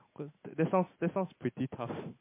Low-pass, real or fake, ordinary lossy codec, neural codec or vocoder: 3.6 kHz; real; MP3, 24 kbps; none